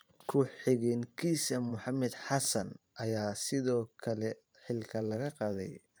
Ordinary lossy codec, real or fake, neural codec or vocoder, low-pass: none; fake; vocoder, 44.1 kHz, 128 mel bands every 512 samples, BigVGAN v2; none